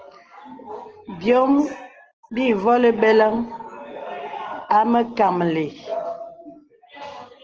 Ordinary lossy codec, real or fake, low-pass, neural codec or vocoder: Opus, 16 kbps; real; 7.2 kHz; none